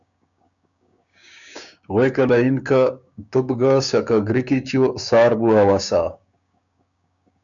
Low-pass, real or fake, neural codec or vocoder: 7.2 kHz; fake; codec, 16 kHz, 6 kbps, DAC